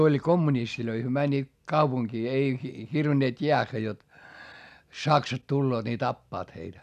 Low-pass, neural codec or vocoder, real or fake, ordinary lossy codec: 14.4 kHz; none; real; none